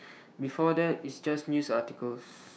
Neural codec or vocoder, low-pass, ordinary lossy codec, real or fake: codec, 16 kHz, 6 kbps, DAC; none; none; fake